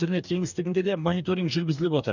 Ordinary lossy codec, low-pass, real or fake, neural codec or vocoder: none; 7.2 kHz; fake; codec, 44.1 kHz, 2.6 kbps, DAC